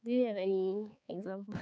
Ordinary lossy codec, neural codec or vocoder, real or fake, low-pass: none; codec, 16 kHz, 4 kbps, X-Codec, HuBERT features, trained on balanced general audio; fake; none